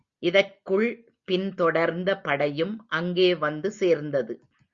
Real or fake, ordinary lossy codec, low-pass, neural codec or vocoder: real; Opus, 64 kbps; 7.2 kHz; none